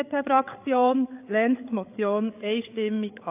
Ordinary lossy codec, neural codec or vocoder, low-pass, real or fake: AAC, 24 kbps; codec, 16 kHz, 8 kbps, FreqCodec, larger model; 3.6 kHz; fake